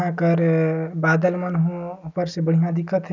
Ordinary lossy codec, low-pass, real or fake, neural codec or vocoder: none; 7.2 kHz; real; none